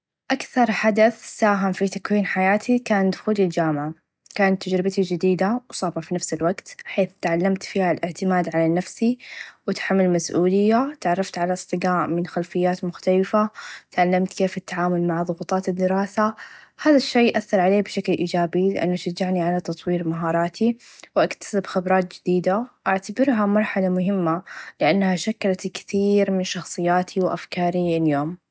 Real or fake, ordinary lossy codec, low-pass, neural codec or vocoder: real; none; none; none